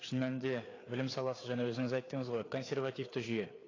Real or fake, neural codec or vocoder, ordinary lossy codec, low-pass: fake; codec, 16 kHz, 4 kbps, FreqCodec, larger model; AAC, 32 kbps; 7.2 kHz